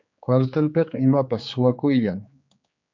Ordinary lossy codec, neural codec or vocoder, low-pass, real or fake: MP3, 64 kbps; codec, 16 kHz, 4 kbps, X-Codec, HuBERT features, trained on general audio; 7.2 kHz; fake